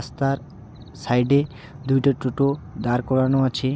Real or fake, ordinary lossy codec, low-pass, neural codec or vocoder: real; none; none; none